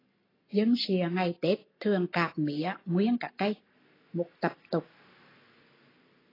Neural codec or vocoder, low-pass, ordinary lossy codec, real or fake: none; 5.4 kHz; AAC, 24 kbps; real